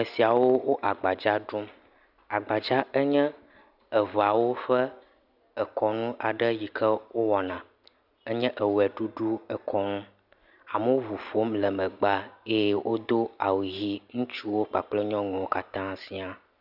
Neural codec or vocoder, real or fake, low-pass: none; real; 5.4 kHz